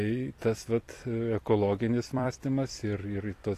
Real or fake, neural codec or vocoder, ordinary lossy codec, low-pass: fake; vocoder, 44.1 kHz, 128 mel bands every 256 samples, BigVGAN v2; AAC, 48 kbps; 14.4 kHz